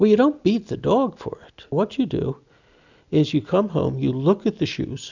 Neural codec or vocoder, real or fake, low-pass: none; real; 7.2 kHz